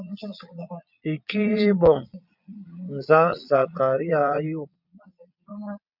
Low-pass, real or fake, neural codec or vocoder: 5.4 kHz; fake; vocoder, 22.05 kHz, 80 mel bands, Vocos